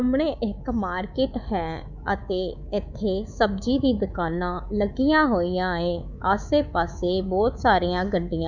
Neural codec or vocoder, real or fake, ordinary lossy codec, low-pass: codec, 24 kHz, 3.1 kbps, DualCodec; fake; none; 7.2 kHz